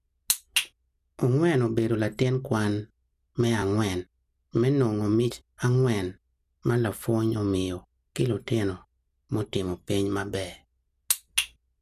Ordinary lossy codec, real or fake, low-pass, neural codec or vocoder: none; real; 14.4 kHz; none